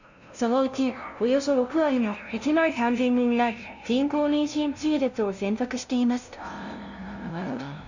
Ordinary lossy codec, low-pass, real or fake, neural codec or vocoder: none; 7.2 kHz; fake; codec, 16 kHz, 0.5 kbps, FunCodec, trained on LibriTTS, 25 frames a second